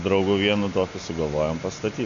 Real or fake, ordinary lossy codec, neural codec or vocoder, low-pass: real; AAC, 48 kbps; none; 7.2 kHz